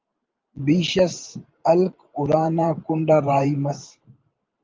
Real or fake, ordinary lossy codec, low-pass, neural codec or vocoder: fake; Opus, 32 kbps; 7.2 kHz; vocoder, 44.1 kHz, 128 mel bands every 512 samples, BigVGAN v2